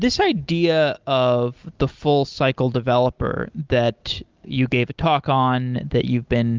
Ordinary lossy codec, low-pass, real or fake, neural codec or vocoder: Opus, 32 kbps; 7.2 kHz; real; none